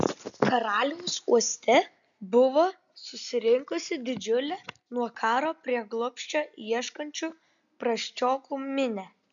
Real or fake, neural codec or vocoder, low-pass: real; none; 7.2 kHz